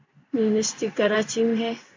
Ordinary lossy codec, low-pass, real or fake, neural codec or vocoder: MP3, 48 kbps; 7.2 kHz; fake; codec, 16 kHz in and 24 kHz out, 1 kbps, XY-Tokenizer